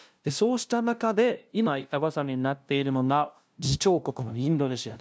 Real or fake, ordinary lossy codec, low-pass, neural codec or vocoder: fake; none; none; codec, 16 kHz, 0.5 kbps, FunCodec, trained on LibriTTS, 25 frames a second